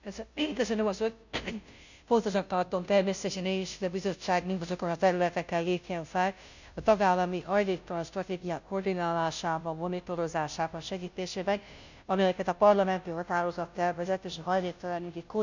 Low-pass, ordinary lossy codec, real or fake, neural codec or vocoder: 7.2 kHz; none; fake; codec, 16 kHz, 0.5 kbps, FunCodec, trained on Chinese and English, 25 frames a second